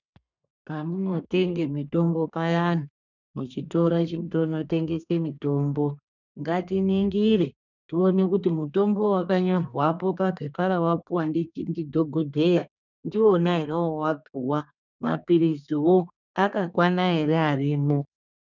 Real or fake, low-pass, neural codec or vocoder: fake; 7.2 kHz; codec, 32 kHz, 1.9 kbps, SNAC